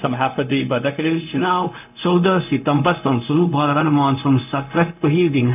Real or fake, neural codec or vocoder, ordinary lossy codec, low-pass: fake; codec, 16 kHz, 0.4 kbps, LongCat-Audio-Codec; none; 3.6 kHz